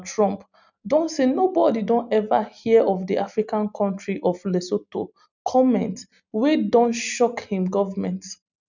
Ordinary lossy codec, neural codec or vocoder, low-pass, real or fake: none; none; 7.2 kHz; real